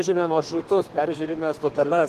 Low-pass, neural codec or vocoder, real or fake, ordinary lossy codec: 14.4 kHz; codec, 32 kHz, 1.9 kbps, SNAC; fake; Opus, 16 kbps